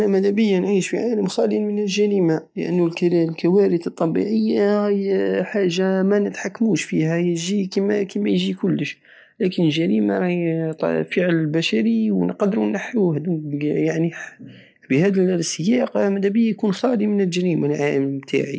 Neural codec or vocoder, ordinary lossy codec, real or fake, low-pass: none; none; real; none